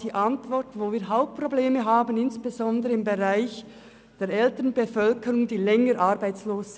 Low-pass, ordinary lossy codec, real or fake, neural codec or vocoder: none; none; real; none